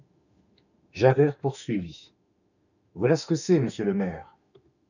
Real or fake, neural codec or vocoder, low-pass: fake; autoencoder, 48 kHz, 32 numbers a frame, DAC-VAE, trained on Japanese speech; 7.2 kHz